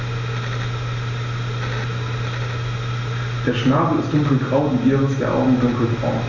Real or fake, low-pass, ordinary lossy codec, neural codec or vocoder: real; 7.2 kHz; none; none